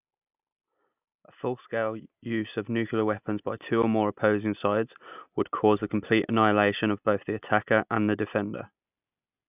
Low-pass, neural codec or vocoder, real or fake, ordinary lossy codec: 3.6 kHz; none; real; none